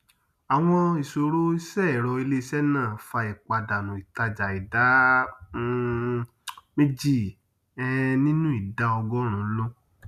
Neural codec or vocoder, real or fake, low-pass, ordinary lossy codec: none; real; 14.4 kHz; none